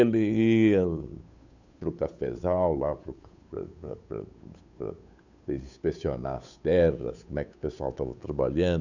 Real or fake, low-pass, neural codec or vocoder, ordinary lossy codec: fake; 7.2 kHz; codec, 16 kHz, 8 kbps, FunCodec, trained on Chinese and English, 25 frames a second; none